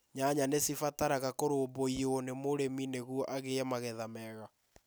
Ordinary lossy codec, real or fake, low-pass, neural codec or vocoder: none; real; none; none